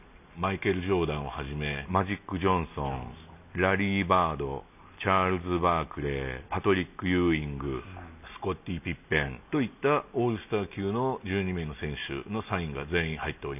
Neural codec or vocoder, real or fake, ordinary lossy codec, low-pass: none; real; none; 3.6 kHz